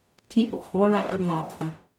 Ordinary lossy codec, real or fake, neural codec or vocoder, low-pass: none; fake; codec, 44.1 kHz, 0.9 kbps, DAC; 19.8 kHz